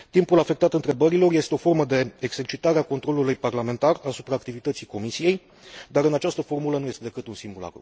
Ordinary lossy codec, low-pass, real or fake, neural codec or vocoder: none; none; real; none